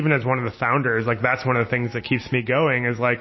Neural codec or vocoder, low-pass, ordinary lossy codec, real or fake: none; 7.2 kHz; MP3, 24 kbps; real